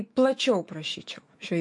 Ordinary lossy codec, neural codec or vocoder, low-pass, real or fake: MP3, 48 kbps; autoencoder, 48 kHz, 128 numbers a frame, DAC-VAE, trained on Japanese speech; 10.8 kHz; fake